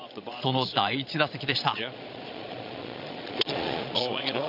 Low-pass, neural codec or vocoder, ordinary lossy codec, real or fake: 5.4 kHz; vocoder, 22.05 kHz, 80 mel bands, Vocos; none; fake